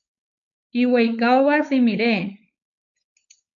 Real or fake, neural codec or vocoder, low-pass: fake; codec, 16 kHz, 4.8 kbps, FACodec; 7.2 kHz